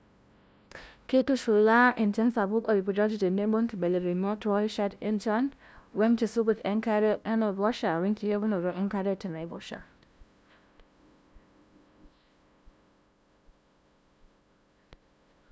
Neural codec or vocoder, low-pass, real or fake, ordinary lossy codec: codec, 16 kHz, 0.5 kbps, FunCodec, trained on LibriTTS, 25 frames a second; none; fake; none